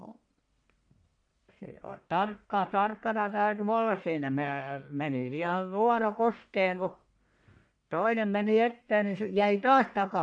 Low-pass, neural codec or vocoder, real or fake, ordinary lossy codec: 9.9 kHz; codec, 44.1 kHz, 1.7 kbps, Pupu-Codec; fake; AAC, 64 kbps